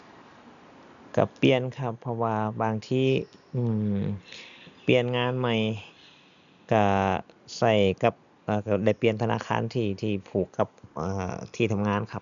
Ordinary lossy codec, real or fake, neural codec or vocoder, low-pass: none; real; none; 7.2 kHz